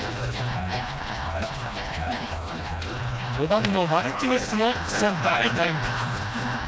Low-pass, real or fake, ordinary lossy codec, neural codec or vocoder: none; fake; none; codec, 16 kHz, 1 kbps, FreqCodec, smaller model